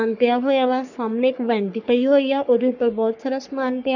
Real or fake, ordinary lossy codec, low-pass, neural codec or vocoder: fake; none; 7.2 kHz; codec, 44.1 kHz, 3.4 kbps, Pupu-Codec